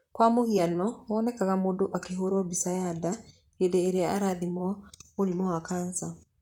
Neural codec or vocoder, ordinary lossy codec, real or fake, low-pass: vocoder, 44.1 kHz, 128 mel bands, Pupu-Vocoder; none; fake; 19.8 kHz